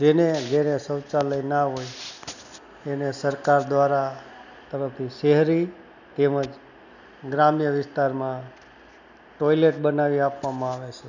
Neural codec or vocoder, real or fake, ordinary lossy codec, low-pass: none; real; none; 7.2 kHz